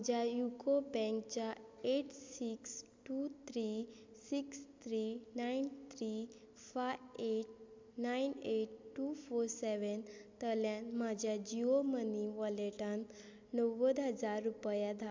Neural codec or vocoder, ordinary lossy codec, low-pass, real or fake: none; MP3, 64 kbps; 7.2 kHz; real